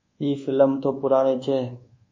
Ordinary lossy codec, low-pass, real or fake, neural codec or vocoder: MP3, 32 kbps; 7.2 kHz; fake; codec, 24 kHz, 1.2 kbps, DualCodec